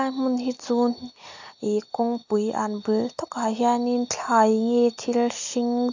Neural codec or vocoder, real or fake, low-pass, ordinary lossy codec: none; real; 7.2 kHz; AAC, 48 kbps